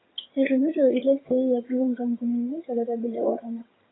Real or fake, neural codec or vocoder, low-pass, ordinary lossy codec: fake; vocoder, 22.05 kHz, 80 mel bands, HiFi-GAN; 7.2 kHz; AAC, 16 kbps